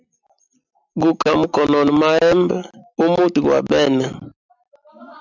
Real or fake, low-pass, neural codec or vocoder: real; 7.2 kHz; none